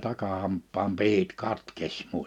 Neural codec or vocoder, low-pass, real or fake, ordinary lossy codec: none; 19.8 kHz; real; none